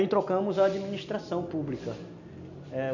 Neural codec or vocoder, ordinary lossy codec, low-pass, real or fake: none; none; 7.2 kHz; real